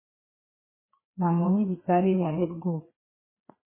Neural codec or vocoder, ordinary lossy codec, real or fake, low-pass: codec, 16 kHz, 2 kbps, FreqCodec, larger model; MP3, 16 kbps; fake; 3.6 kHz